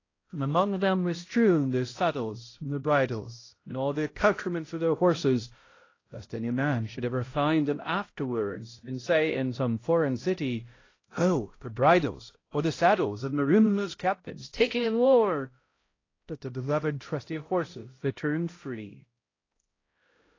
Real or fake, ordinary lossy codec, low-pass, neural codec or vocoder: fake; AAC, 32 kbps; 7.2 kHz; codec, 16 kHz, 0.5 kbps, X-Codec, HuBERT features, trained on balanced general audio